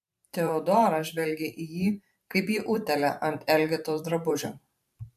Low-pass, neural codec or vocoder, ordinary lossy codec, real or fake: 14.4 kHz; vocoder, 44.1 kHz, 128 mel bands every 512 samples, BigVGAN v2; MP3, 96 kbps; fake